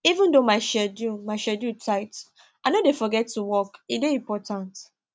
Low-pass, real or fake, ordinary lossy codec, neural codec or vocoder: none; real; none; none